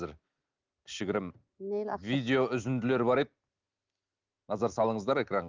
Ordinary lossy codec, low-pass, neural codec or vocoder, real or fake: Opus, 24 kbps; 7.2 kHz; none; real